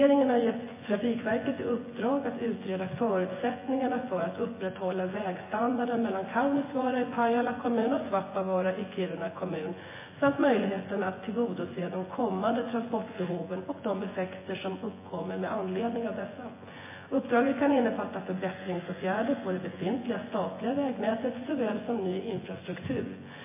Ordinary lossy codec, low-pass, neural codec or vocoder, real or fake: MP3, 16 kbps; 3.6 kHz; vocoder, 24 kHz, 100 mel bands, Vocos; fake